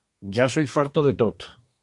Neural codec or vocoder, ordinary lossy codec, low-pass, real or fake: codec, 24 kHz, 1 kbps, SNAC; MP3, 64 kbps; 10.8 kHz; fake